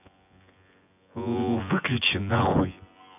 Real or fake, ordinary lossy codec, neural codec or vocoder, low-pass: fake; none; vocoder, 24 kHz, 100 mel bands, Vocos; 3.6 kHz